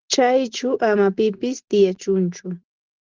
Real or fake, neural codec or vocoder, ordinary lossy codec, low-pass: real; none; Opus, 16 kbps; 7.2 kHz